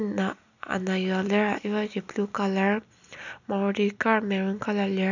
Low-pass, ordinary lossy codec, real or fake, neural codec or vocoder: 7.2 kHz; none; real; none